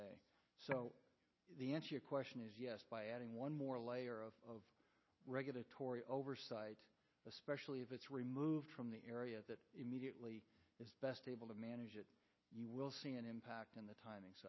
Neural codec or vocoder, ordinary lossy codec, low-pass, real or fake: none; MP3, 24 kbps; 7.2 kHz; real